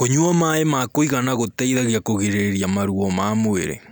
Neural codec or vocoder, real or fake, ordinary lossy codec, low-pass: none; real; none; none